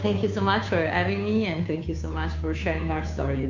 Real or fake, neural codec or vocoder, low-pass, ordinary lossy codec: fake; codec, 16 kHz, 2 kbps, FunCodec, trained on Chinese and English, 25 frames a second; 7.2 kHz; AAC, 48 kbps